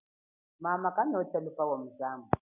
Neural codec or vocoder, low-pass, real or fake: none; 3.6 kHz; real